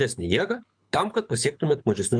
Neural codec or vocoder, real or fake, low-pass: codec, 24 kHz, 6 kbps, HILCodec; fake; 9.9 kHz